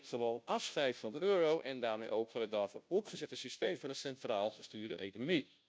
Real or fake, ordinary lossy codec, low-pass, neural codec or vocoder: fake; none; none; codec, 16 kHz, 0.5 kbps, FunCodec, trained on Chinese and English, 25 frames a second